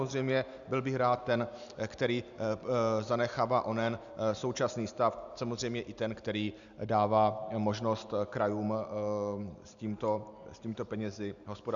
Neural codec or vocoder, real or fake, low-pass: none; real; 7.2 kHz